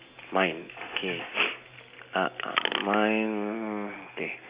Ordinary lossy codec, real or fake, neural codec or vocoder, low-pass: Opus, 64 kbps; real; none; 3.6 kHz